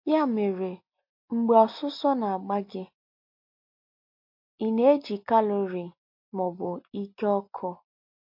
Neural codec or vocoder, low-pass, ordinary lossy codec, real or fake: none; 5.4 kHz; MP3, 32 kbps; real